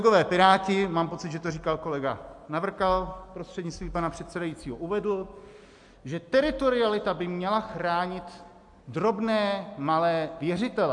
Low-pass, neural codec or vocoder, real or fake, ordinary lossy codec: 10.8 kHz; none; real; MP3, 64 kbps